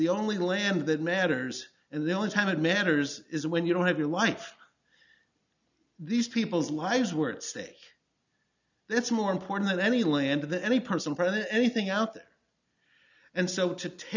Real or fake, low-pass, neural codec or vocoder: real; 7.2 kHz; none